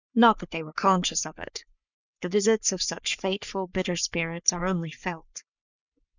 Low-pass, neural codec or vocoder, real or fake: 7.2 kHz; codec, 44.1 kHz, 3.4 kbps, Pupu-Codec; fake